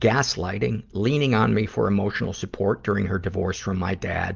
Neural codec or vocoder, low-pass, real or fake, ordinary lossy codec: none; 7.2 kHz; real; Opus, 24 kbps